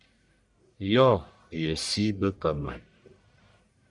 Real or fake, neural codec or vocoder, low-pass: fake; codec, 44.1 kHz, 1.7 kbps, Pupu-Codec; 10.8 kHz